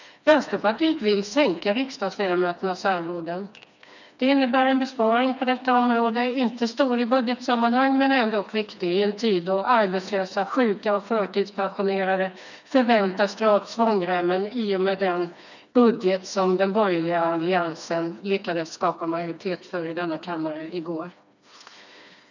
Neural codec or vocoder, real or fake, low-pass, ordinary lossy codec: codec, 16 kHz, 2 kbps, FreqCodec, smaller model; fake; 7.2 kHz; none